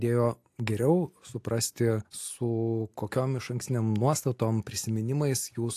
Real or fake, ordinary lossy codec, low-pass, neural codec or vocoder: real; AAC, 64 kbps; 14.4 kHz; none